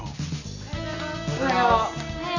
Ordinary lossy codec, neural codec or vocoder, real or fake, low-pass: none; none; real; 7.2 kHz